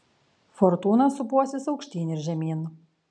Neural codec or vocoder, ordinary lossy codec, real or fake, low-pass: none; MP3, 96 kbps; real; 9.9 kHz